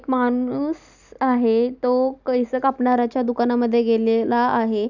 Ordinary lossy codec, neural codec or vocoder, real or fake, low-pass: none; none; real; 7.2 kHz